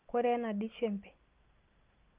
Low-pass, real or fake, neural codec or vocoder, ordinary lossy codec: 3.6 kHz; real; none; Opus, 24 kbps